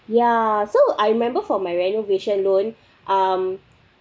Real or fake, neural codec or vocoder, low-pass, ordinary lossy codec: real; none; none; none